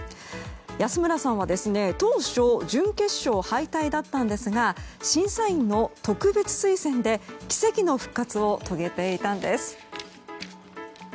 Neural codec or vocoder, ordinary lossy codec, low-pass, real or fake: none; none; none; real